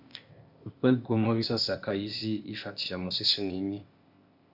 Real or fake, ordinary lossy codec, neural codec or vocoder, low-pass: fake; Opus, 64 kbps; codec, 16 kHz, 0.8 kbps, ZipCodec; 5.4 kHz